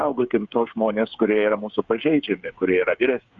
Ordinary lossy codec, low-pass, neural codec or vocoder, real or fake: Opus, 64 kbps; 7.2 kHz; codec, 16 kHz, 8 kbps, FreqCodec, smaller model; fake